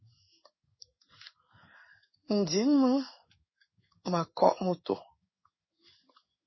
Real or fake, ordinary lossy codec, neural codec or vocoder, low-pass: fake; MP3, 24 kbps; codec, 16 kHz in and 24 kHz out, 1 kbps, XY-Tokenizer; 7.2 kHz